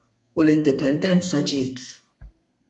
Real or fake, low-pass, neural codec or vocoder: fake; 10.8 kHz; codec, 32 kHz, 1.9 kbps, SNAC